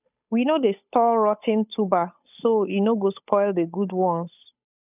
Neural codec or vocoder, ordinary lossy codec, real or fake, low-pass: codec, 16 kHz, 8 kbps, FunCodec, trained on Chinese and English, 25 frames a second; none; fake; 3.6 kHz